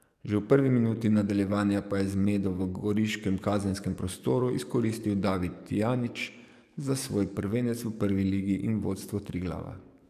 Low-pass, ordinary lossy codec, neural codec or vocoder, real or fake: 14.4 kHz; none; codec, 44.1 kHz, 7.8 kbps, DAC; fake